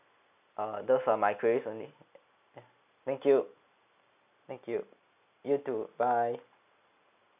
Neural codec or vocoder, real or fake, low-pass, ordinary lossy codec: none; real; 3.6 kHz; none